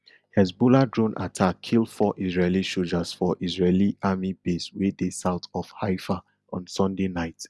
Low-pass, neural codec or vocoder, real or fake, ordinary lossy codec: none; none; real; none